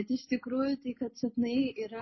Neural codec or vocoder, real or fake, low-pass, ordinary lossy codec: none; real; 7.2 kHz; MP3, 24 kbps